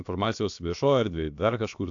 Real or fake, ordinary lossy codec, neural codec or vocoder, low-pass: fake; AAC, 64 kbps; codec, 16 kHz, about 1 kbps, DyCAST, with the encoder's durations; 7.2 kHz